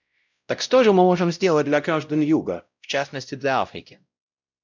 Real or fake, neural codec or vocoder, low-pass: fake; codec, 16 kHz, 0.5 kbps, X-Codec, WavLM features, trained on Multilingual LibriSpeech; 7.2 kHz